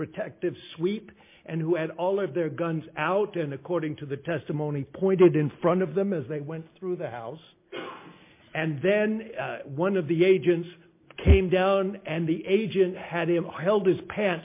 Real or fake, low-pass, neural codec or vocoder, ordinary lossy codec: real; 3.6 kHz; none; MP3, 24 kbps